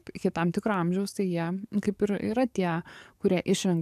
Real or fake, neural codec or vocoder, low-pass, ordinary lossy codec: fake; codec, 44.1 kHz, 7.8 kbps, DAC; 14.4 kHz; AAC, 96 kbps